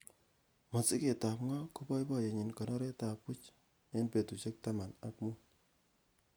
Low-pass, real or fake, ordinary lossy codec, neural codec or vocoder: none; real; none; none